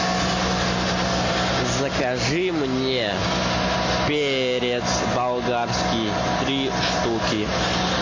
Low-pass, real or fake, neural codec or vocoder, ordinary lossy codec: 7.2 kHz; real; none; AAC, 32 kbps